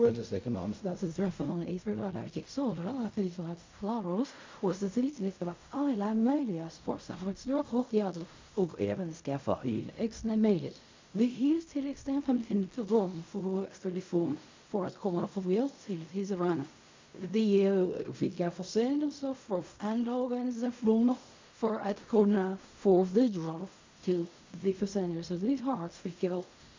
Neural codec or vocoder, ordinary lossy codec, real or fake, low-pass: codec, 16 kHz in and 24 kHz out, 0.4 kbps, LongCat-Audio-Codec, fine tuned four codebook decoder; MP3, 48 kbps; fake; 7.2 kHz